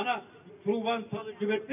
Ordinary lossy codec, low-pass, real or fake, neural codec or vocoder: none; 3.6 kHz; real; none